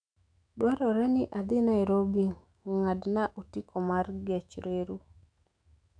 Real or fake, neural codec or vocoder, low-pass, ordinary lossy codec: fake; autoencoder, 48 kHz, 128 numbers a frame, DAC-VAE, trained on Japanese speech; 9.9 kHz; none